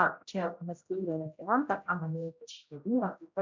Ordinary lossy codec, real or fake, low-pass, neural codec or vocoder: MP3, 64 kbps; fake; 7.2 kHz; codec, 16 kHz, 0.5 kbps, X-Codec, HuBERT features, trained on general audio